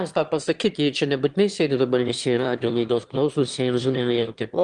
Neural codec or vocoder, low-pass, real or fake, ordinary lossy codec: autoencoder, 22.05 kHz, a latent of 192 numbers a frame, VITS, trained on one speaker; 9.9 kHz; fake; Opus, 24 kbps